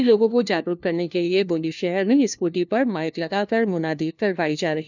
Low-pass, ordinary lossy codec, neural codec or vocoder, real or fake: 7.2 kHz; none; codec, 16 kHz, 1 kbps, FunCodec, trained on LibriTTS, 50 frames a second; fake